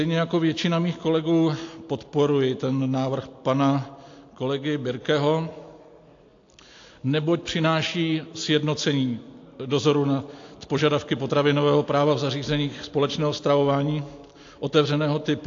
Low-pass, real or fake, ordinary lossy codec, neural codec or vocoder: 7.2 kHz; real; AAC, 48 kbps; none